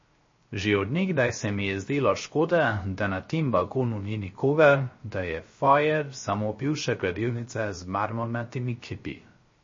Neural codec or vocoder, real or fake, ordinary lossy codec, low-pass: codec, 16 kHz, 0.3 kbps, FocalCodec; fake; MP3, 32 kbps; 7.2 kHz